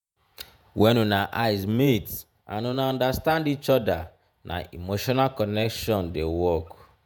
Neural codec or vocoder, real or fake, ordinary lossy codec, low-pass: vocoder, 48 kHz, 128 mel bands, Vocos; fake; none; none